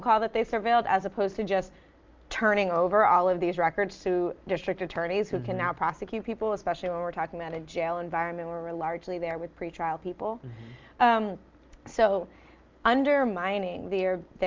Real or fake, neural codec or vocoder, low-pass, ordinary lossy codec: real; none; 7.2 kHz; Opus, 24 kbps